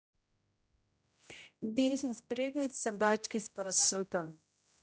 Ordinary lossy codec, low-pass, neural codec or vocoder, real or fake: none; none; codec, 16 kHz, 0.5 kbps, X-Codec, HuBERT features, trained on general audio; fake